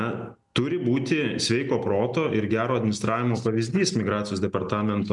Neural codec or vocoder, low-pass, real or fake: none; 10.8 kHz; real